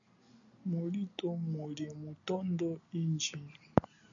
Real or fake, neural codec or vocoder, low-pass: real; none; 7.2 kHz